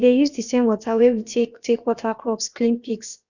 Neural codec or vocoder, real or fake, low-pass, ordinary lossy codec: codec, 16 kHz, about 1 kbps, DyCAST, with the encoder's durations; fake; 7.2 kHz; none